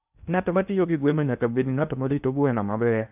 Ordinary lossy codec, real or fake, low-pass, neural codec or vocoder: AAC, 32 kbps; fake; 3.6 kHz; codec, 16 kHz in and 24 kHz out, 0.8 kbps, FocalCodec, streaming, 65536 codes